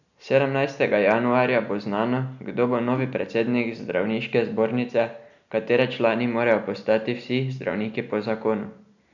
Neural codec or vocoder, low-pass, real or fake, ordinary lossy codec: none; 7.2 kHz; real; none